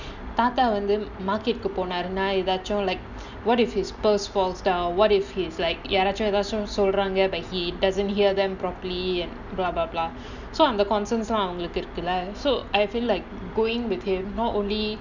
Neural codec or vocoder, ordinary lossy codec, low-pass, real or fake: none; none; 7.2 kHz; real